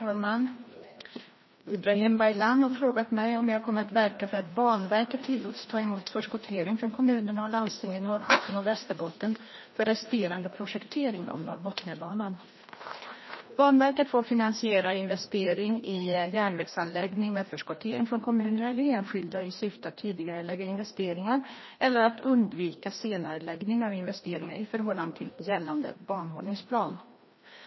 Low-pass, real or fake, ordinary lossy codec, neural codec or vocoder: 7.2 kHz; fake; MP3, 24 kbps; codec, 16 kHz, 1 kbps, FreqCodec, larger model